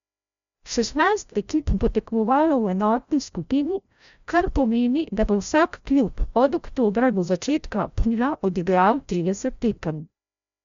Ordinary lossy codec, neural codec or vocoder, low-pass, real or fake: MP3, 64 kbps; codec, 16 kHz, 0.5 kbps, FreqCodec, larger model; 7.2 kHz; fake